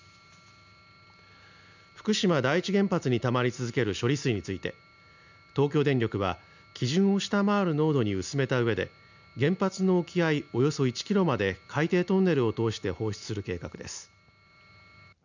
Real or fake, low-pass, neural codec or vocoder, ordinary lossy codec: real; 7.2 kHz; none; none